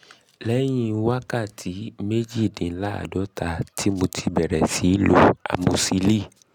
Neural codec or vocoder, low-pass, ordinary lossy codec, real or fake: none; 19.8 kHz; none; real